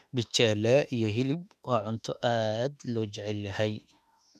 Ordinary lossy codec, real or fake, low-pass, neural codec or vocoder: none; fake; 14.4 kHz; autoencoder, 48 kHz, 32 numbers a frame, DAC-VAE, trained on Japanese speech